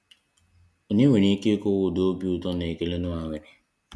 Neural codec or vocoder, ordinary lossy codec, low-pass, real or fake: none; none; none; real